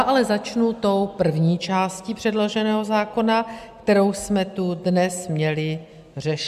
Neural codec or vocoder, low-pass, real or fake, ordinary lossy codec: none; 14.4 kHz; real; MP3, 96 kbps